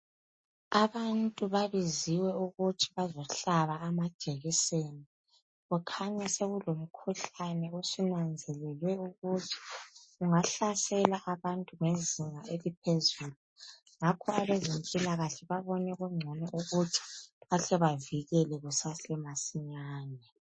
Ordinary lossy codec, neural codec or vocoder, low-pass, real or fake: MP3, 32 kbps; none; 9.9 kHz; real